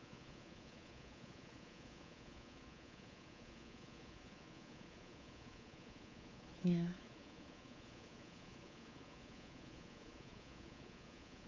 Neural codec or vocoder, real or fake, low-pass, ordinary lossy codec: codec, 24 kHz, 3.1 kbps, DualCodec; fake; 7.2 kHz; MP3, 48 kbps